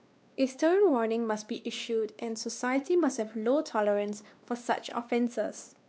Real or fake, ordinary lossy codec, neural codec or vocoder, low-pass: fake; none; codec, 16 kHz, 2 kbps, X-Codec, WavLM features, trained on Multilingual LibriSpeech; none